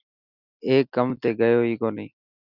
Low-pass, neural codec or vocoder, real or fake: 5.4 kHz; none; real